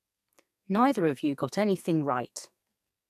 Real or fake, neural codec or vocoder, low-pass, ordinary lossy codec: fake; codec, 44.1 kHz, 2.6 kbps, SNAC; 14.4 kHz; AAC, 96 kbps